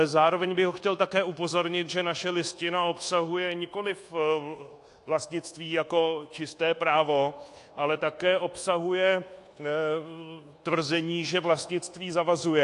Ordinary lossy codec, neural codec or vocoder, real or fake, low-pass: AAC, 48 kbps; codec, 24 kHz, 1.2 kbps, DualCodec; fake; 10.8 kHz